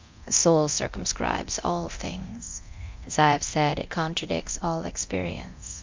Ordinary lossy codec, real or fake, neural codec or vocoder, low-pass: MP3, 64 kbps; fake; codec, 24 kHz, 0.9 kbps, DualCodec; 7.2 kHz